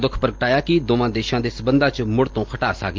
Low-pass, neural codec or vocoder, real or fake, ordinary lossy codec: 7.2 kHz; none; real; Opus, 24 kbps